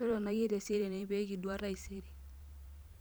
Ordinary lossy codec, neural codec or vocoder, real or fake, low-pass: none; vocoder, 44.1 kHz, 128 mel bands every 512 samples, BigVGAN v2; fake; none